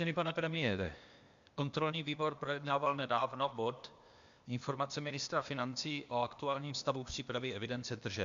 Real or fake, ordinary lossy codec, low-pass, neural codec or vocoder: fake; MP3, 64 kbps; 7.2 kHz; codec, 16 kHz, 0.8 kbps, ZipCodec